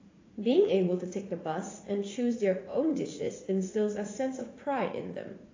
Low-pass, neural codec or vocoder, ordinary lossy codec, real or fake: 7.2 kHz; vocoder, 44.1 kHz, 80 mel bands, Vocos; AAC, 32 kbps; fake